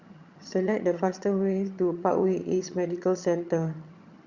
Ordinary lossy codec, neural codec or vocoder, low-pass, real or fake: Opus, 64 kbps; vocoder, 22.05 kHz, 80 mel bands, HiFi-GAN; 7.2 kHz; fake